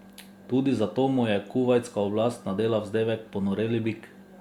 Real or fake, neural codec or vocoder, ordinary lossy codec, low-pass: real; none; Opus, 64 kbps; 19.8 kHz